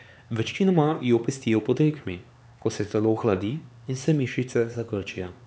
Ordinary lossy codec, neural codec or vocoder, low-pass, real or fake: none; codec, 16 kHz, 4 kbps, X-Codec, HuBERT features, trained on LibriSpeech; none; fake